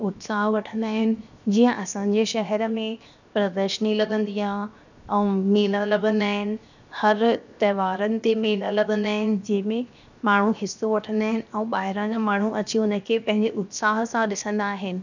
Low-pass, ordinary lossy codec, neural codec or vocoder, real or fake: 7.2 kHz; none; codec, 16 kHz, 0.7 kbps, FocalCodec; fake